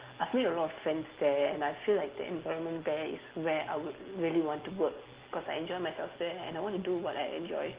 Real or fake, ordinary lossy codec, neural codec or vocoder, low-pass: real; Opus, 16 kbps; none; 3.6 kHz